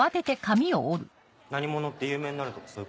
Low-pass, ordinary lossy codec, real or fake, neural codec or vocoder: none; none; real; none